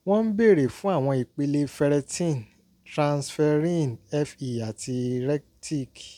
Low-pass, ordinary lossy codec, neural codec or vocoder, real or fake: 19.8 kHz; none; none; real